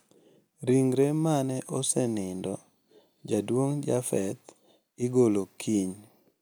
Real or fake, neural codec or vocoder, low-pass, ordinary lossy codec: real; none; none; none